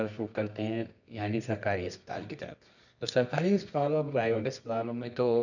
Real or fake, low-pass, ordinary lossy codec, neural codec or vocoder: fake; 7.2 kHz; none; codec, 24 kHz, 0.9 kbps, WavTokenizer, medium music audio release